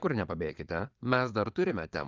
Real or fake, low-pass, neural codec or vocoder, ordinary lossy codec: fake; 7.2 kHz; vocoder, 22.05 kHz, 80 mel bands, Vocos; Opus, 32 kbps